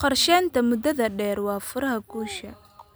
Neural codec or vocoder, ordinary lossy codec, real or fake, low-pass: none; none; real; none